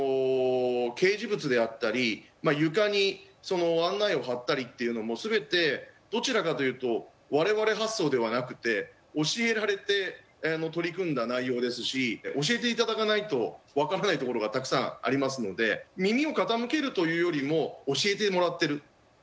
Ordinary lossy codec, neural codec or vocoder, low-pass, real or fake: none; none; none; real